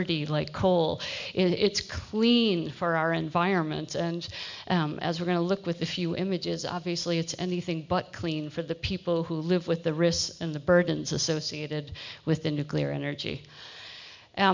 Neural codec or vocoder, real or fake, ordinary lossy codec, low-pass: none; real; MP3, 64 kbps; 7.2 kHz